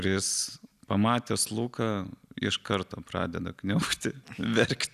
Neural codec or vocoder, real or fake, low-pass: none; real; 14.4 kHz